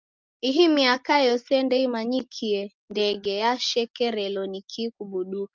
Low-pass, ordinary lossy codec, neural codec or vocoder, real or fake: 7.2 kHz; Opus, 24 kbps; none; real